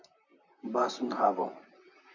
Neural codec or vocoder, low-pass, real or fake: vocoder, 44.1 kHz, 128 mel bands, Pupu-Vocoder; 7.2 kHz; fake